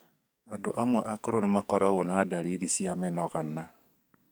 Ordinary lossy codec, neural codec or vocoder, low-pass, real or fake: none; codec, 44.1 kHz, 2.6 kbps, SNAC; none; fake